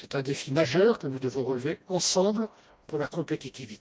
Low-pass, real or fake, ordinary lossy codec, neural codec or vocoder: none; fake; none; codec, 16 kHz, 1 kbps, FreqCodec, smaller model